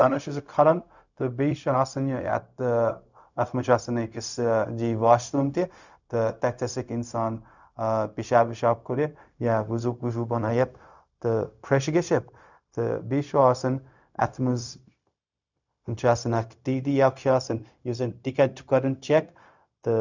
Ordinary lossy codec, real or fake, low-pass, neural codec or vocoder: none; fake; 7.2 kHz; codec, 16 kHz, 0.4 kbps, LongCat-Audio-Codec